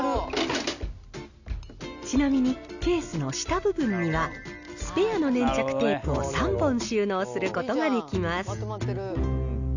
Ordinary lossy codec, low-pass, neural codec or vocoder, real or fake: none; 7.2 kHz; none; real